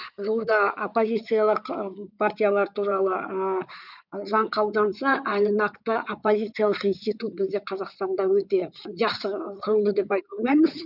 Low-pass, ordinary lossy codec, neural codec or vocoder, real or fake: 5.4 kHz; none; codec, 16 kHz in and 24 kHz out, 2.2 kbps, FireRedTTS-2 codec; fake